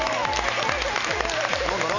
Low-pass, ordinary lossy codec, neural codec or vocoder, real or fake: 7.2 kHz; none; none; real